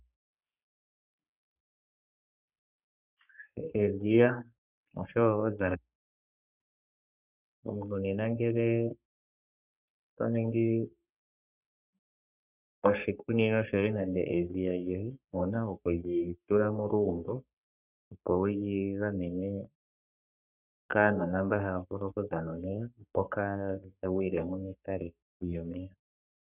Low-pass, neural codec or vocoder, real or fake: 3.6 kHz; codec, 44.1 kHz, 3.4 kbps, Pupu-Codec; fake